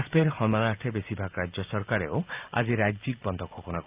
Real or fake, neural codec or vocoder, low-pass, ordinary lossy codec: real; none; 3.6 kHz; Opus, 24 kbps